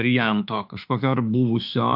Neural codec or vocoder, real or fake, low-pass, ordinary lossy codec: autoencoder, 48 kHz, 32 numbers a frame, DAC-VAE, trained on Japanese speech; fake; 5.4 kHz; AAC, 48 kbps